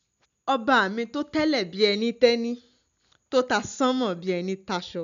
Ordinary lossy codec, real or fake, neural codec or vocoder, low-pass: none; real; none; 7.2 kHz